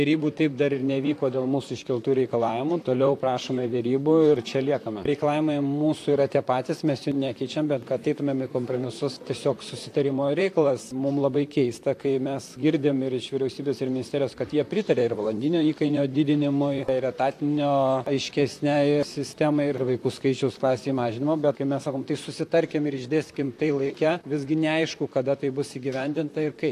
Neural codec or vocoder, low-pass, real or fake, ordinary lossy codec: vocoder, 44.1 kHz, 128 mel bands, Pupu-Vocoder; 14.4 kHz; fake; AAC, 64 kbps